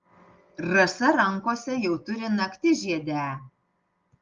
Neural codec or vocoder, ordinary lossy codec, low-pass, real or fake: none; Opus, 32 kbps; 7.2 kHz; real